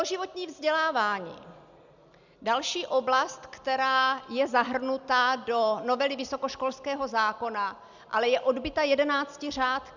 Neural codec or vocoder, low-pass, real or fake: none; 7.2 kHz; real